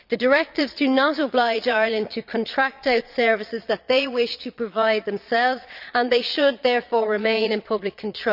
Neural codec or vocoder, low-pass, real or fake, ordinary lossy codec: vocoder, 22.05 kHz, 80 mel bands, Vocos; 5.4 kHz; fake; none